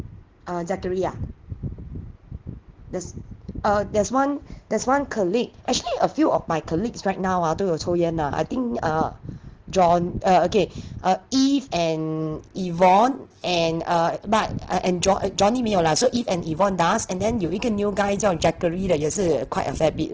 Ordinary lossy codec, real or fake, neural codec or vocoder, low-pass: Opus, 16 kbps; real; none; 7.2 kHz